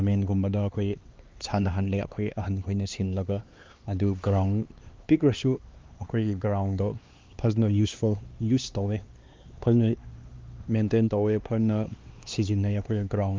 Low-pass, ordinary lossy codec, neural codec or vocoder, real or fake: 7.2 kHz; Opus, 32 kbps; codec, 16 kHz, 2 kbps, X-Codec, HuBERT features, trained on LibriSpeech; fake